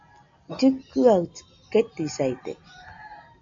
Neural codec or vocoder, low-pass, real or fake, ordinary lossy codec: none; 7.2 kHz; real; AAC, 64 kbps